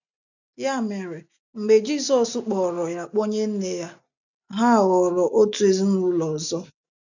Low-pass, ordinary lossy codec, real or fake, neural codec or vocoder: 7.2 kHz; none; real; none